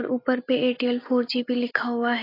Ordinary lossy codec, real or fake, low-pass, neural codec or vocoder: AAC, 24 kbps; real; 5.4 kHz; none